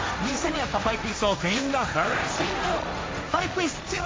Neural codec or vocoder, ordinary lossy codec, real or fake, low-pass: codec, 16 kHz, 1.1 kbps, Voila-Tokenizer; none; fake; none